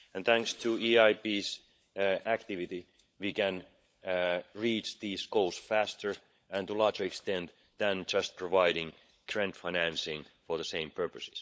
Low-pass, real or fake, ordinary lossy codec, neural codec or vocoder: none; fake; none; codec, 16 kHz, 16 kbps, FunCodec, trained on LibriTTS, 50 frames a second